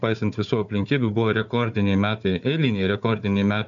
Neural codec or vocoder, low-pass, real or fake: codec, 16 kHz, 4 kbps, FunCodec, trained on Chinese and English, 50 frames a second; 7.2 kHz; fake